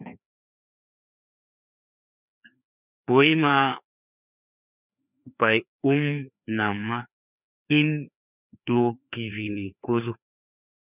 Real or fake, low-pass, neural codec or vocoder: fake; 3.6 kHz; codec, 16 kHz, 2 kbps, FreqCodec, larger model